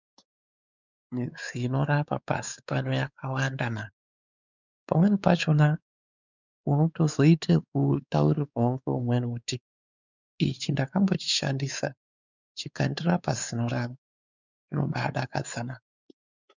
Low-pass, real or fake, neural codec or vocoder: 7.2 kHz; fake; codec, 16 kHz, 4 kbps, X-Codec, WavLM features, trained on Multilingual LibriSpeech